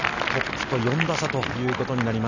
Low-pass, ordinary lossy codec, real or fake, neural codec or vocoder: 7.2 kHz; none; real; none